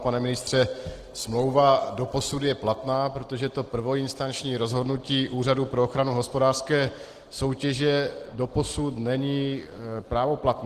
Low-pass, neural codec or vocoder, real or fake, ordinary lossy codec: 14.4 kHz; none; real; Opus, 16 kbps